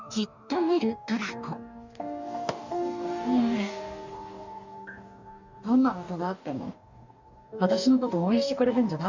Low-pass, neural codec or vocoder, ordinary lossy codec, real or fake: 7.2 kHz; codec, 44.1 kHz, 2.6 kbps, DAC; none; fake